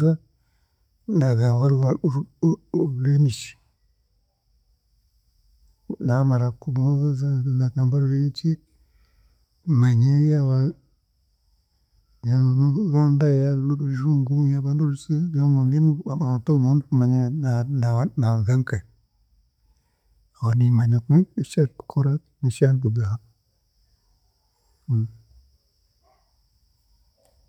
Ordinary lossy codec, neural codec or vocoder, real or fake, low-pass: none; none; real; 19.8 kHz